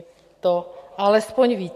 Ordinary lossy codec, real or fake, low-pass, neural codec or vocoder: AAC, 64 kbps; real; 14.4 kHz; none